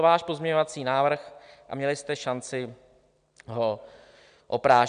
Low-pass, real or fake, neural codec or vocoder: 9.9 kHz; real; none